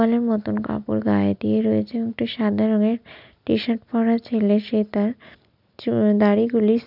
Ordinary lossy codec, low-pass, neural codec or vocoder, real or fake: none; 5.4 kHz; none; real